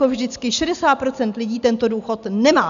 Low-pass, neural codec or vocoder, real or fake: 7.2 kHz; none; real